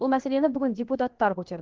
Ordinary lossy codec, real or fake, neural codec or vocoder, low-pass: Opus, 16 kbps; fake; codec, 16 kHz, about 1 kbps, DyCAST, with the encoder's durations; 7.2 kHz